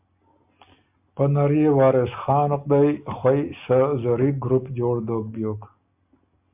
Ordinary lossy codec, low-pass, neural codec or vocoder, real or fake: MP3, 32 kbps; 3.6 kHz; none; real